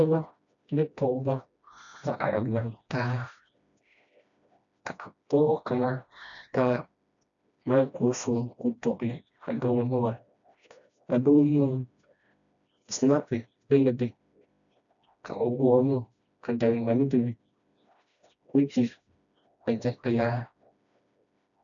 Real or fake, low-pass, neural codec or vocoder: fake; 7.2 kHz; codec, 16 kHz, 1 kbps, FreqCodec, smaller model